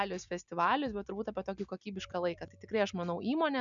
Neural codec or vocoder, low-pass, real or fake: none; 7.2 kHz; real